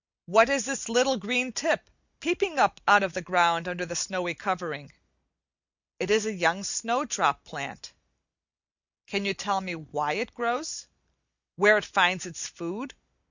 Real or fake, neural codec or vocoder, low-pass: real; none; 7.2 kHz